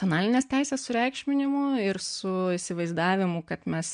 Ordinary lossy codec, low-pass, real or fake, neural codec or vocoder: MP3, 64 kbps; 9.9 kHz; real; none